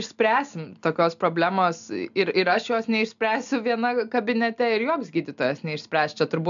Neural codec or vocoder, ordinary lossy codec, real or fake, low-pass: none; MP3, 96 kbps; real; 7.2 kHz